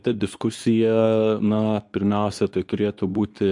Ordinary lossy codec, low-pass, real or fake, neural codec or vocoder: MP3, 96 kbps; 10.8 kHz; fake; codec, 24 kHz, 0.9 kbps, WavTokenizer, medium speech release version 2